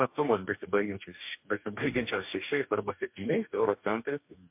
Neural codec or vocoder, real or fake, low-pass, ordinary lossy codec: codec, 44.1 kHz, 2.6 kbps, DAC; fake; 3.6 kHz; MP3, 32 kbps